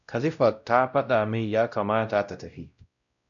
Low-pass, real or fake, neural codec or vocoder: 7.2 kHz; fake; codec, 16 kHz, 0.5 kbps, X-Codec, WavLM features, trained on Multilingual LibriSpeech